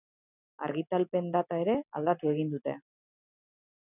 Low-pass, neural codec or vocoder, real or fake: 3.6 kHz; none; real